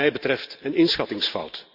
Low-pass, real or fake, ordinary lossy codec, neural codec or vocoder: 5.4 kHz; real; Opus, 64 kbps; none